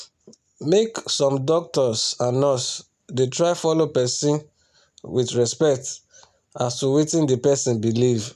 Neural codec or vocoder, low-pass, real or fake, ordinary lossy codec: none; 14.4 kHz; real; none